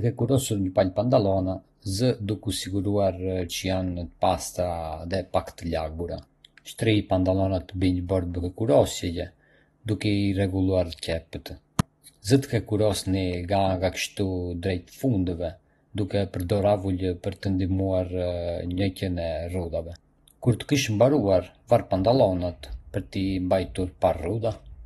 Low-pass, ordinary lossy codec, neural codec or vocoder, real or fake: 19.8 kHz; AAC, 32 kbps; none; real